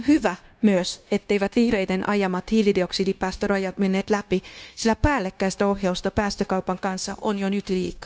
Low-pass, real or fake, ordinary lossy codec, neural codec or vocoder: none; fake; none; codec, 16 kHz, 0.9 kbps, LongCat-Audio-Codec